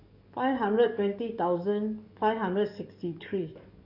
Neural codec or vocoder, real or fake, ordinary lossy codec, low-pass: codec, 44.1 kHz, 7.8 kbps, DAC; fake; none; 5.4 kHz